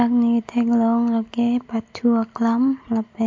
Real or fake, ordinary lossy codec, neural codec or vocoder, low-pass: real; MP3, 48 kbps; none; 7.2 kHz